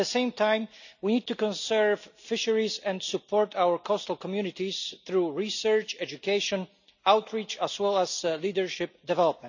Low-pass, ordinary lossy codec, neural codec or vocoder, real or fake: 7.2 kHz; none; none; real